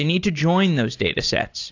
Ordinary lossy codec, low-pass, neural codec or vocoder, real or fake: AAC, 48 kbps; 7.2 kHz; none; real